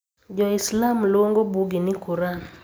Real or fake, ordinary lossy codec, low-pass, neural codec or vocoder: real; none; none; none